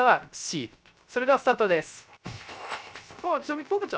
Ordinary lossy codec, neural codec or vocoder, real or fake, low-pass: none; codec, 16 kHz, 0.3 kbps, FocalCodec; fake; none